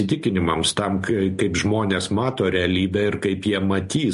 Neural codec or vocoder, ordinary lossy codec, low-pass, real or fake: none; MP3, 48 kbps; 14.4 kHz; real